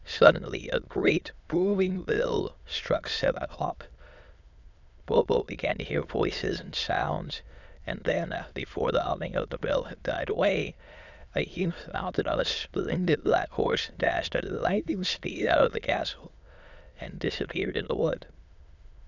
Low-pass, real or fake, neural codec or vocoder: 7.2 kHz; fake; autoencoder, 22.05 kHz, a latent of 192 numbers a frame, VITS, trained on many speakers